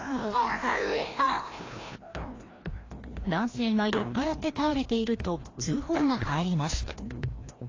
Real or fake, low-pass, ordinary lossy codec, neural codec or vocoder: fake; 7.2 kHz; AAC, 32 kbps; codec, 16 kHz, 1 kbps, FreqCodec, larger model